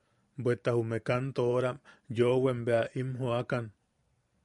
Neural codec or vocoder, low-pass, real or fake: vocoder, 44.1 kHz, 128 mel bands every 512 samples, BigVGAN v2; 10.8 kHz; fake